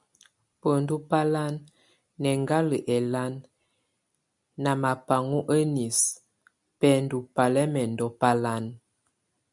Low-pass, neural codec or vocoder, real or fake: 10.8 kHz; none; real